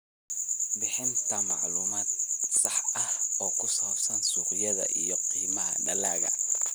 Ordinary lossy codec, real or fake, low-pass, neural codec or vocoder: none; real; none; none